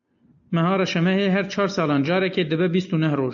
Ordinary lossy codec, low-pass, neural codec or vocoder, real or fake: AAC, 64 kbps; 7.2 kHz; none; real